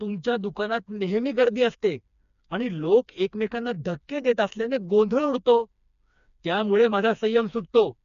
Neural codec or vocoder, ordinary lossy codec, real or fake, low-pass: codec, 16 kHz, 2 kbps, FreqCodec, smaller model; none; fake; 7.2 kHz